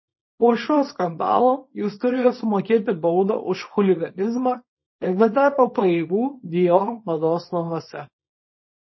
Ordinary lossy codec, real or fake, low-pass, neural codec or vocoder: MP3, 24 kbps; fake; 7.2 kHz; codec, 24 kHz, 0.9 kbps, WavTokenizer, small release